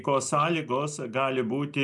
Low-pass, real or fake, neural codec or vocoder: 10.8 kHz; real; none